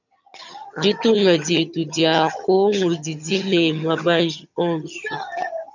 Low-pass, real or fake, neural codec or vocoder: 7.2 kHz; fake; vocoder, 22.05 kHz, 80 mel bands, HiFi-GAN